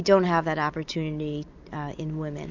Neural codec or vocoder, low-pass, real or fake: none; 7.2 kHz; real